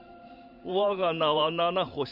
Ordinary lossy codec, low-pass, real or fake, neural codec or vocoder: none; 5.4 kHz; fake; vocoder, 44.1 kHz, 128 mel bands every 512 samples, BigVGAN v2